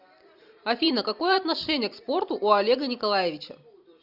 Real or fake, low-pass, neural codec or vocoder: fake; 5.4 kHz; vocoder, 44.1 kHz, 128 mel bands every 256 samples, BigVGAN v2